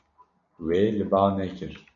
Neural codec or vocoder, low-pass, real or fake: none; 7.2 kHz; real